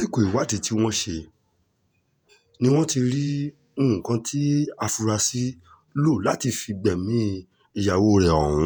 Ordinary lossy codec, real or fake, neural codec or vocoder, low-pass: none; fake; vocoder, 48 kHz, 128 mel bands, Vocos; none